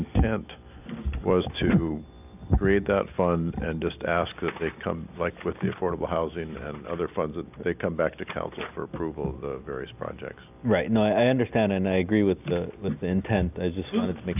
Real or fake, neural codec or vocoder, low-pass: real; none; 3.6 kHz